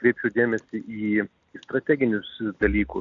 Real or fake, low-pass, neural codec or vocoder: real; 7.2 kHz; none